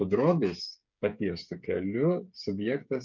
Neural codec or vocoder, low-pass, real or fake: codec, 16 kHz, 8 kbps, FreqCodec, smaller model; 7.2 kHz; fake